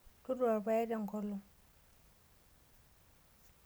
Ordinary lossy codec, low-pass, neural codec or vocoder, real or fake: none; none; none; real